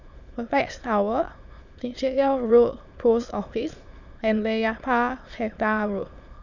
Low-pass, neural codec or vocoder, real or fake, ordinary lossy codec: 7.2 kHz; autoencoder, 22.05 kHz, a latent of 192 numbers a frame, VITS, trained on many speakers; fake; none